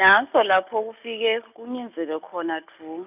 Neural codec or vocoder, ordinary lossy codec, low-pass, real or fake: none; none; 3.6 kHz; real